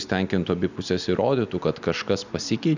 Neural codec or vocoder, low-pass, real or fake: none; 7.2 kHz; real